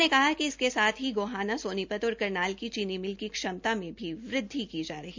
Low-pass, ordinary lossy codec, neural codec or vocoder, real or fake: 7.2 kHz; MP3, 64 kbps; none; real